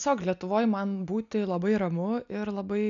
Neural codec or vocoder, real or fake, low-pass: none; real; 7.2 kHz